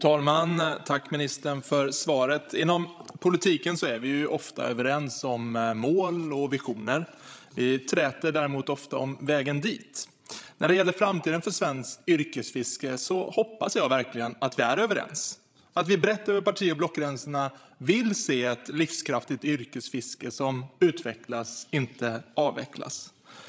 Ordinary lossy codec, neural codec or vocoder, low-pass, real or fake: none; codec, 16 kHz, 16 kbps, FreqCodec, larger model; none; fake